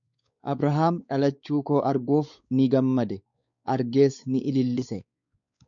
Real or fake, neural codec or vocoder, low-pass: fake; codec, 16 kHz, 4 kbps, X-Codec, WavLM features, trained on Multilingual LibriSpeech; 7.2 kHz